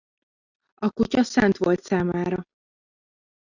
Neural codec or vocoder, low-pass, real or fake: none; 7.2 kHz; real